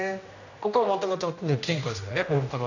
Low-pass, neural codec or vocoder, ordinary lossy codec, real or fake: 7.2 kHz; codec, 16 kHz, 1 kbps, X-Codec, HuBERT features, trained on general audio; none; fake